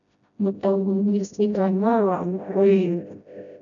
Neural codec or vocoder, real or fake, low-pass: codec, 16 kHz, 0.5 kbps, FreqCodec, smaller model; fake; 7.2 kHz